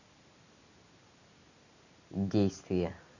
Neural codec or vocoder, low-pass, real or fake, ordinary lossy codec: none; 7.2 kHz; real; none